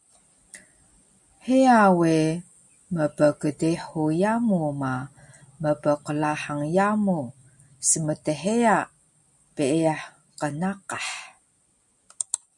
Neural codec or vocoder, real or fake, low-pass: none; real; 10.8 kHz